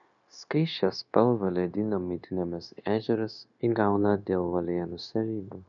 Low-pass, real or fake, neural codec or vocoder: 7.2 kHz; fake; codec, 16 kHz, 0.9 kbps, LongCat-Audio-Codec